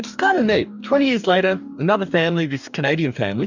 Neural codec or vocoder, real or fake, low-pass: codec, 44.1 kHz, 2.6 kbps, DAC; fake; 7.2 kHz